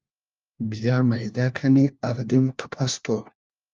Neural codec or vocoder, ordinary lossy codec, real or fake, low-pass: codec, 16 kHz, 1 kbps, FunCodec, trained on LibriTTS, 50 frames a second; Opus, 32 kbps; fake; 7.2 kHz